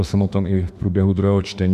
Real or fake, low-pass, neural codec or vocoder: fake; 14.4 kHz; autoencoder, 48 kHz, 32 numbers a frame, DAC-VAE, trained on Japanese speech